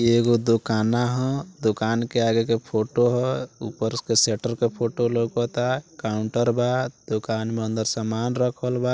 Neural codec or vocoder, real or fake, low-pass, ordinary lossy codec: none; real; none; none